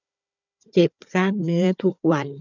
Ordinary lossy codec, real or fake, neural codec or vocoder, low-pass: none; fake; codec, 16 kHz, 4 kbps, FunCodec, trained on Chinese and English, 50 frames a second; 7.2 kHz